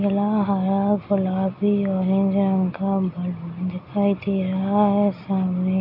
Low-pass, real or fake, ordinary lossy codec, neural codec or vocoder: 5.4 kHz; real; none; none